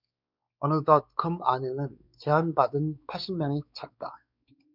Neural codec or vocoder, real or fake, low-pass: codec, 16 kHz, 4 kbps, X-Codec, WavLM features, trained on Multilingual LibriSpeech; fake; 5.4 kHz